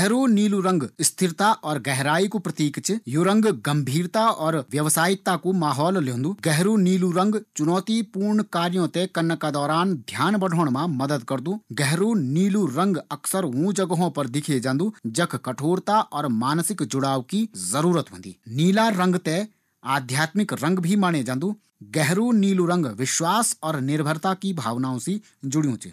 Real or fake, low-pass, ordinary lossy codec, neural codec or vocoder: real; 14.4 kHz; none; none